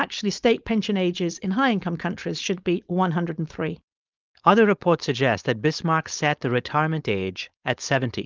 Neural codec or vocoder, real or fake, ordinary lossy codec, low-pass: codec, 16 kHz, 4.8 kbps, FACodec; fake; Opus, 24 kbps; 7.2 kHz